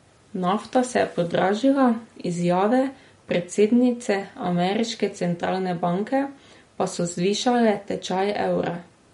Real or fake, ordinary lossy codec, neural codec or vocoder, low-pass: real; MP3, 48 kbps; none; 10.8 kHz